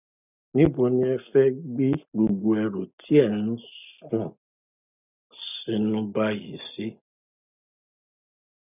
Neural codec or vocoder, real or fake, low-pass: none; real; 3.6 kHz